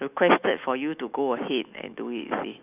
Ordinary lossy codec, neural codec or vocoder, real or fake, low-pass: none; autoencoder, 48 kHz, 128 numbers a frame, DAC-VAE, trained on Japanese speech; fake; 3.6 kHz